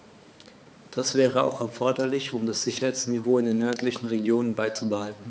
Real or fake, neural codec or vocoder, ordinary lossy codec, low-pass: fake; codec, 16 kHz, 4 kbps, X-Codec, HuBERT features, trained on balanced general audio; none; none